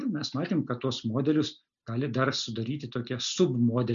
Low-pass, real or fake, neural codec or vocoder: 7.2 kHz; real; none